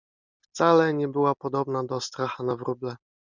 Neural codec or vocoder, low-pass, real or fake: none; 7.2 kHz; real